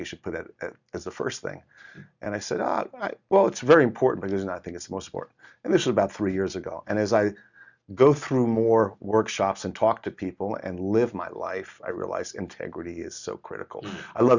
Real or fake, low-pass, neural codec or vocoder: real; 7.2 kHz; none